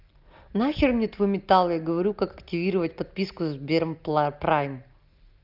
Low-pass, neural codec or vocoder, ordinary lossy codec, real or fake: 5.4 kHz; none; Opus, 24 kbps; real